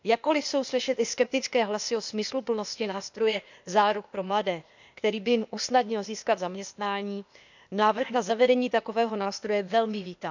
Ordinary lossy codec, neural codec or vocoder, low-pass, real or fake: none; codec, 16 kHz, 0.8 kbps, ZipCodec; 7.2 kHz; fake